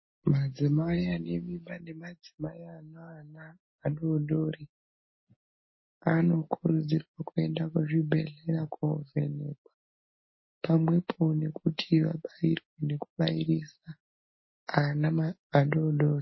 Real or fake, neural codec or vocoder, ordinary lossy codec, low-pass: real; none; MP3, 24 kbps; 7.2 kHz